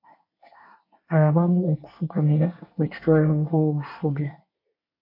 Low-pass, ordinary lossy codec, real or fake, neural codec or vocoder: 5.4 kHz; MP3, 32 kbps; fake; codec, 24 kHz, 1 kbps, SNAC